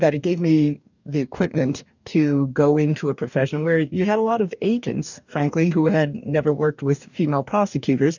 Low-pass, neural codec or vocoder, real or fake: 7.2 kHz; codec, 44.1 kHz, 2.6 kbps, DAC; fake